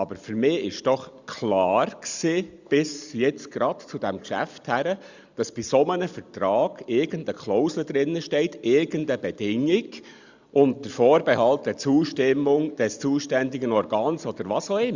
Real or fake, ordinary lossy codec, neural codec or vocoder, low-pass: real; Opus, 64 kbps; none; 7.2 kHz